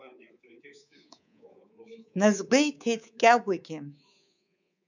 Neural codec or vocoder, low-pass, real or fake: codec, 24 kHz, 3.1 kbps, DualCodec; 7.2 kHz; fake